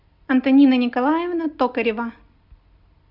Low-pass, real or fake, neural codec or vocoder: 5.4 kHz; real; none